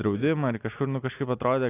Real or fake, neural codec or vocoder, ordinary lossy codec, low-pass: real; none; AAC, 24 kbps; 3.6 kHz